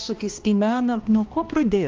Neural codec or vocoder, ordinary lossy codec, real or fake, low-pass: codec, 16 kHz, 1 kbps, X-Codec, HuBERT features, trained on balanced general audio; Opus, 32 kbps; fake; 7.2 kHz